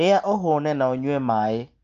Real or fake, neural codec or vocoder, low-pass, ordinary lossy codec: real; none; 7.2 kHz; Opus, 24 kbps